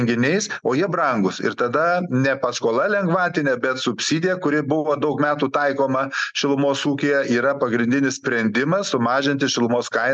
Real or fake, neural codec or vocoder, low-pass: real; none; 9.9 kHz